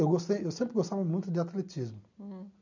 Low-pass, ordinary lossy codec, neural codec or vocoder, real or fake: 7.2 kHz; none; none; real